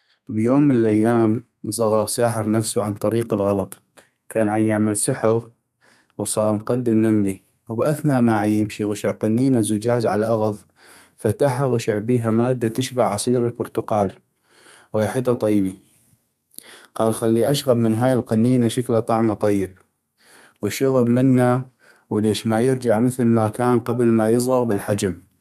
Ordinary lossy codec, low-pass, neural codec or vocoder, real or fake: none; 14.4 kHz; codec, 32 kHz, 1.9 kbps, SNAC; fake